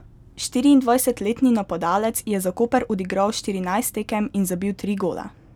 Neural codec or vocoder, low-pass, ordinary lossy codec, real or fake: none; 19.8 kHz; none; real